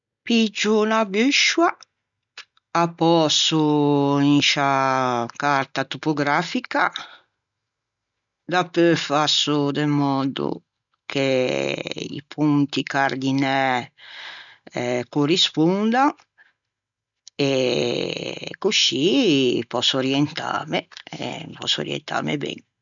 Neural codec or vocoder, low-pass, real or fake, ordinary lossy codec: none; 7.2 kHz; real; none